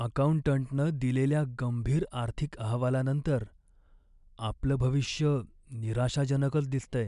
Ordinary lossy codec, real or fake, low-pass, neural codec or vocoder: MP3, 96 kbps; real; 10.8 kHz; none